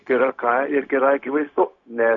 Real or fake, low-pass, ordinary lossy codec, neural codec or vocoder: fake; 7.2 kHz; MP3, 48 kbps; codec, 16 kHz, 0.4 kbps, LongCat-Audio-Codec